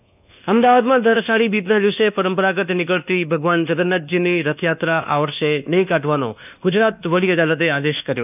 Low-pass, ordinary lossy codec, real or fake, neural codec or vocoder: 3.6 kHz; none; fake; codec, 24 kHz, 1.2 kbps, DualCodec